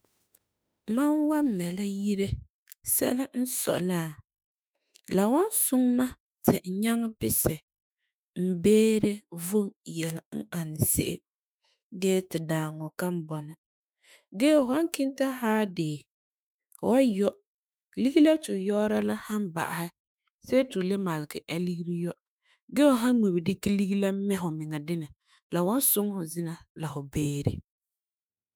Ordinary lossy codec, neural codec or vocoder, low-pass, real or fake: none; autoencoder, 48 kHz, 32 numbers a frame, DAC-VAE, trained on Japanese speech; none; fake